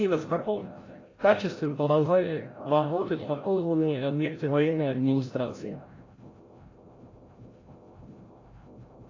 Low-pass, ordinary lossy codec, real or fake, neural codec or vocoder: 7.2 kHz; AAC, 32 kbps; fake; codec, 16 kHz, 0.5 kbps, FreqCodec, larger model